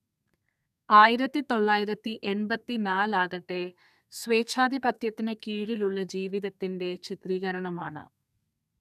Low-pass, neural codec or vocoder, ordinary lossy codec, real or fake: 14.4 kHz; codec, 32 kHz, 1.9 kbps, SNAC; none; fake